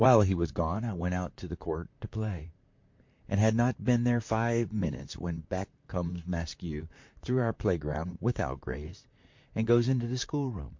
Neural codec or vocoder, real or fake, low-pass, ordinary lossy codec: vocoder, 44.1 kHz, 128 mel bands, Pupu-Vocoder; fake; 7.2 kHz; MP3, 48 kbps